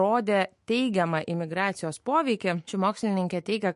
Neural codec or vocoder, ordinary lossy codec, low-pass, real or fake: autoencoder, 48 kHz, 128 numbers a frame, DAC-VAE, trained on Japanese speech; MP3, 48 kbps; 14.4 kHz; fake